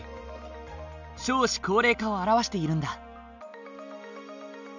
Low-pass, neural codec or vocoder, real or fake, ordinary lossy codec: 7.2 kHz; none; real; none